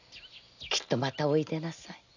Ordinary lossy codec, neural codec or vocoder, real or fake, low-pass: none; none; real; 7.2 kHz